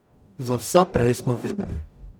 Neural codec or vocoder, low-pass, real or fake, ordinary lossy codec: codec, 44.1 kHz, 0.9 kbps, DAC; none; fake; none